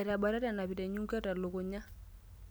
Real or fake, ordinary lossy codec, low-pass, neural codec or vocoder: real; none; none; none